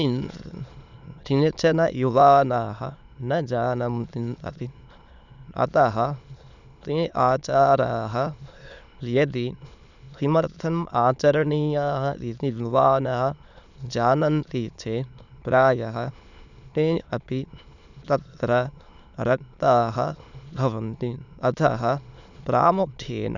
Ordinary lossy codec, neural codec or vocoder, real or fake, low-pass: none; autoencoder, 22.05 kHz, a latent of 192 numbers a frame, VITS, trained on many speakers; fake; 7.2 kHz